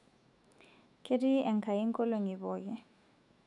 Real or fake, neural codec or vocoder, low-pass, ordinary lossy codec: fake; autoencoder, 48 kHz, 128 numbers a frame, DAC-VAE, trained on Japanese speech; 10.8 kHz; none